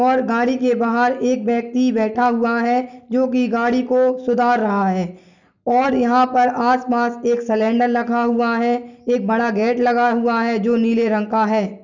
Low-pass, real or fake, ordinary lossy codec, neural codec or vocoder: 7.2 kHz; real; none; none